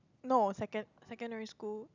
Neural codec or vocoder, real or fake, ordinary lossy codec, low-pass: none; real; none; 7.2 kHz